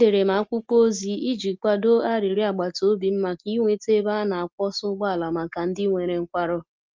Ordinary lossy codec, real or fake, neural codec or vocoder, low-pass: Opus, 24 kbps; real; none; 7.2 kHz